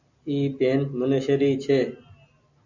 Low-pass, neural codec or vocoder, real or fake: 7.2 kHz; none; real